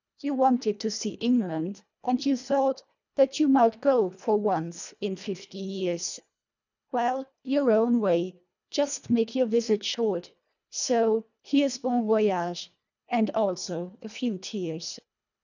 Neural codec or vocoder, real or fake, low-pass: codec, 24 kHz, 1.5 kbps, HILCodec; fake; 7.2 kHz